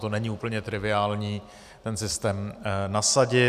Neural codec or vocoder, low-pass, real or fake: none; 14.4 kHz; real